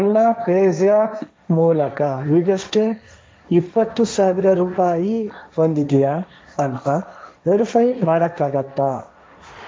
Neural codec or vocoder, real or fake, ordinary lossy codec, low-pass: codec, 16 kHz, 1.1 kbps, Voila-Tokenizer; fake; none; none